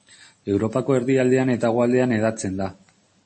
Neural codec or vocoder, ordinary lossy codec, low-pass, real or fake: none; MP3, 32 kbps; 10.8 kHz; real